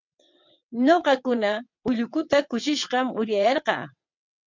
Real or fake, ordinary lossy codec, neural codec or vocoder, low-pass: fake; MP3, 64 kbps; vocoder, 22.05 kHz, 80 mel bands, WaveNeXt; 7.2 kHz